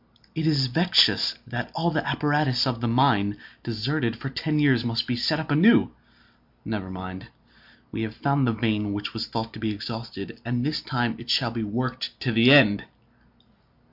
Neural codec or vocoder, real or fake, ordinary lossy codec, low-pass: none; real; MP3, 48 kbps; 5.4 kHz